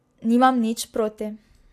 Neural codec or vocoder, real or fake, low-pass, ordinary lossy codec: none; real; 14.4 kHz; AAC, 64 kbps